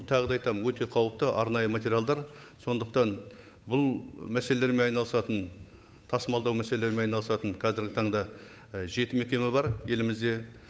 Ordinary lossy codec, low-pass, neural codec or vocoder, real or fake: none; none; codec, 16 kHz, 8 kbps, FunCodec, trained on Chinese and English, 25 frames a second; fake